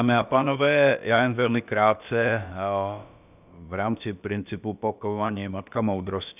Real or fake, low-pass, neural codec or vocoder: fake; 3.6 kHz; codec, 16 kHz, about 1 kbps, DyCAST, with the encoder's durations